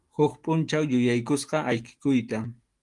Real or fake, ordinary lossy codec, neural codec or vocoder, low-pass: fake; Opus, 24 kbps; autoencoder, 48 kHz, 128 numbers a frame, DAC-VAE, trained on Japanese speech; 10.8 kHz